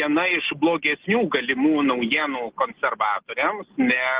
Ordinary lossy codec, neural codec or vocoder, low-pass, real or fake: Opus, 16 kbps; none; 3.6 kHz; real